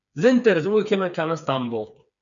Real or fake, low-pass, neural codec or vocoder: fake; 7.2 kHz; codec, 16 kHz, 4 kbps, FreqCodec, smaller model